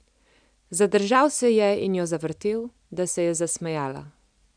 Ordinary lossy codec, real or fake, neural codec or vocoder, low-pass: none; real; none; 9.9 kHz